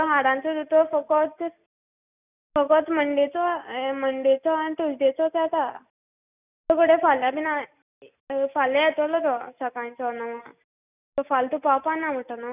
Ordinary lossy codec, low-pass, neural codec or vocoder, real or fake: none; 3.6 kHz; none; real